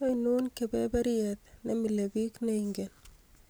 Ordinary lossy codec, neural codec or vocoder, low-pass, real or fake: none; none; none; real